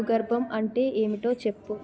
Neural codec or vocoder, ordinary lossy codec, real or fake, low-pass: none; none; real; none